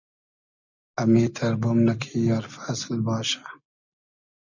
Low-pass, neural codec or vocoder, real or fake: 7.2 kHz; none; real